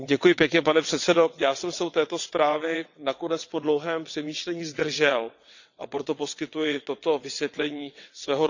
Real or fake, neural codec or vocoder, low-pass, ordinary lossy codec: fake; vocoder, 22.05 kHz, 80 mel bands, WaveNeXt; 7.2 kHz; none